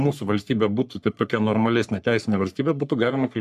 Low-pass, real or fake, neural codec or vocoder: 14.4 kHz; fake; codec, 44.1 kHz, 3.4 kbps, Pupu-Codec